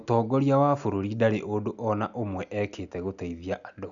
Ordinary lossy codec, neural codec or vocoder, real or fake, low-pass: none; none; real; 7.2 kHz